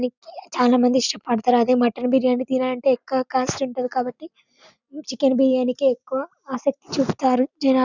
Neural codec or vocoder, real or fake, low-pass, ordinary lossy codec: none; real; 7.2 kHz; none